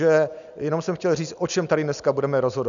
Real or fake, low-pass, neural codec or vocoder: real; 7.2 kHz; none